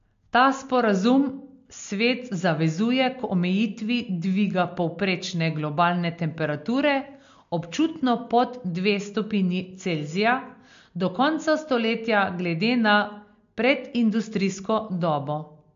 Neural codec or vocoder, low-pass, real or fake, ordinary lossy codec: none; 7.2 kHz; real; MP3, 48 kbps